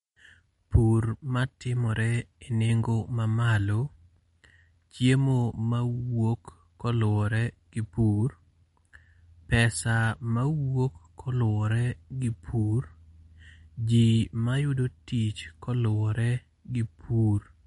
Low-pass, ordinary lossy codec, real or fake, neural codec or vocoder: 19.8 kHz; MP3, 48 kbps; real; none